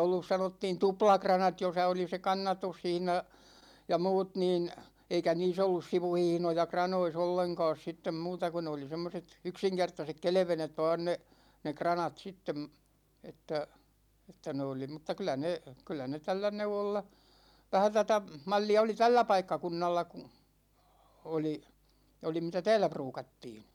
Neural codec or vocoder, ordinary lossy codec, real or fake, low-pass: none; none; real; 19.8 kHz